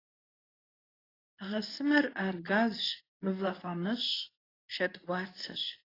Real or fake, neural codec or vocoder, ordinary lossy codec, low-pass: fake; codec, 24 kHz, 0.9 kbps, WavTokenizer, medium speech release version 2; AAC, 24 kbps; 5.4 kHz